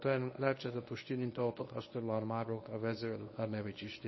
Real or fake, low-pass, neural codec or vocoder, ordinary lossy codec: fake; 7.2 kHz; codec, 24 kHz, 0.9 kbps, WavTokenizer, medium speech release version 1; MP3, 24 kbps